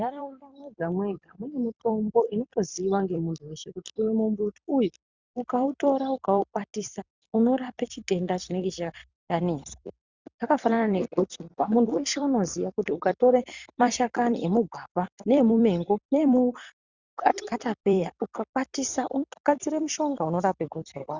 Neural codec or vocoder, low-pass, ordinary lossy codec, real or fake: vocoder, 44.1 kHz, 128 mel bands every 256 samples, BigVGAN v2; 7.2 kHz; Opus, 64 kbps; fake